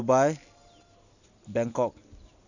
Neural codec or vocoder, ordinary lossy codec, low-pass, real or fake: none; none; 7.2 kHz; real